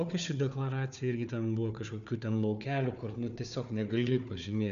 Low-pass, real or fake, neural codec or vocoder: 7.2 kHz; fake; codec, 16 kHz, 4 kbps, FunCodec, trained on Chinese and English, 50 frames a second